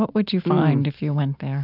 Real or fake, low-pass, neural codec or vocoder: real; 5.4 kHz; none